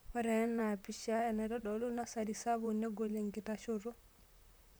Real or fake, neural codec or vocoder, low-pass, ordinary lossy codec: fake; vocoder, 44.1 kHz, 128 mel bands, Pupu-Vocoder; none; none